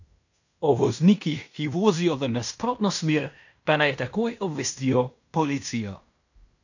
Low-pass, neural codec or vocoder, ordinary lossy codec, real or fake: 7.2 kHz; codec, 16 kHz in and 24 kHz out, 0.9 kbps, LongCat-Audio-Codec, fine tuned four codebook decoder; none; fake